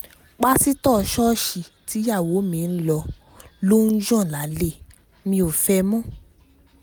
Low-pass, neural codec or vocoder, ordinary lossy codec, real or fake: none; none; none; real